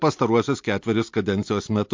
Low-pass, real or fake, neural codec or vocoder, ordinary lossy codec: 7.2 kHz; real; none; MP3, 48 kbps